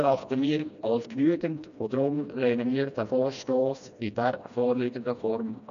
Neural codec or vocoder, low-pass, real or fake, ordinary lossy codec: codec, 16 kHz, 1 kbps, FreqCodec, smaller model; 7.2 kHz; fake; MP3, 96 kbps